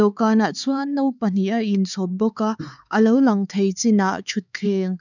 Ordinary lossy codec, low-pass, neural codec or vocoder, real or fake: none; 7.2 kHz; codec, 16 kHz, 4 kbps, X-Codec, HuBERT features, trained on LibriSpeech; fake